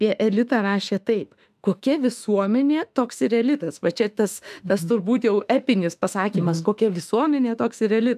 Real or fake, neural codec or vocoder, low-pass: fake; autoencoder, 48 kHz, 32 numbers a frame, DAC-VAE, trained on Japanese speech; 14.4 kHz